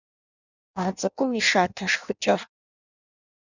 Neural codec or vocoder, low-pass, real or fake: codec, 16 kHz in and 24 kHz out, 0.6 kbps, FireRedTTS-2 codec; 7.2 kHz; fake